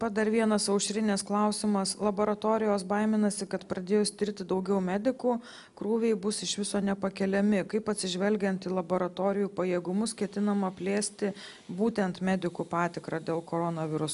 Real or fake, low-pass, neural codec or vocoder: real; 10.8 kHz; none